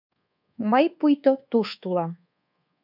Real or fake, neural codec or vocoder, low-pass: fake; codec, 24 kHz, 1.2 kbps, DualCodec; 5.4 kHz